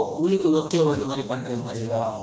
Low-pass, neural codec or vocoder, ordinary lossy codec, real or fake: none; codec, 16 kHz, 1 kbps, FreqCodec, smaller model; none; fake